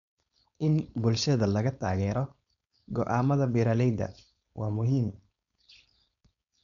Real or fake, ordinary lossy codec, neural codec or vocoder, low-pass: fake; none; codec, 16 kHz, 4.8 kbps, FACodec; 7.2 kHz